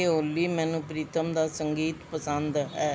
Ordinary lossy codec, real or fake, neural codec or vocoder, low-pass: none; real; none; none